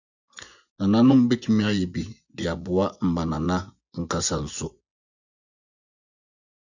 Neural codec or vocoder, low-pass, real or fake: vocoder, 24 kHz, 100 mel bands, Vocos; 7.2 kHz; fake